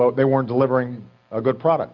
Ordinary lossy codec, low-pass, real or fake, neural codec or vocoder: Opus, 64 kbps; 7.2 kHz; real; none